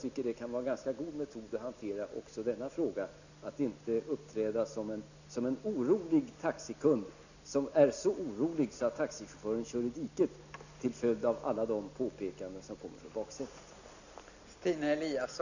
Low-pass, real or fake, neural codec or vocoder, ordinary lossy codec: 7.2 kHz; real; none; none